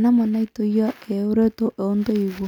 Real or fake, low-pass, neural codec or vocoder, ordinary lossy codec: real; 19.8 kHz; none; none